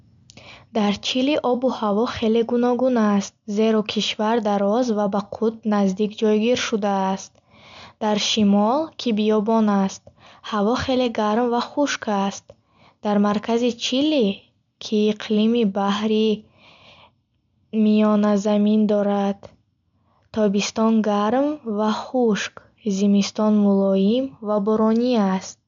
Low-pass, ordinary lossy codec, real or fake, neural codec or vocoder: 7.2 kHz; MP3, 64 kbps; real; none